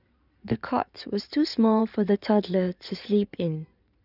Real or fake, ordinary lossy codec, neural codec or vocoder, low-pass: fake; none; codec, 16 kHz in and 24 kHz out, 2.2 kbps, FireRedTTS-2 codec; 5.4 kHz